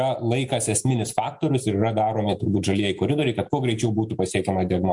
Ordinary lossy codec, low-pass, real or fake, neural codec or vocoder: MP3, 64 kbps; 10.8 kHz; real; none